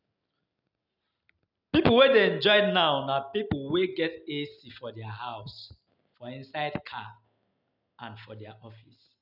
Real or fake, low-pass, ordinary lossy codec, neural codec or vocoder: real; 5.4 kHz; none; none